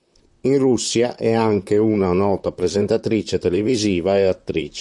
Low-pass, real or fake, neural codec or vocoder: 10.8 kHz; fake; vocoder, 44.1 kHz, 128 mel bands, Pupu-Vocoder